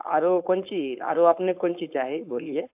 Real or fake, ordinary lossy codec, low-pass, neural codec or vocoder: fake; AAC, 32 kbps; 3.6 kHz; codec, 16 kHz, 4.8 kbps, FACodec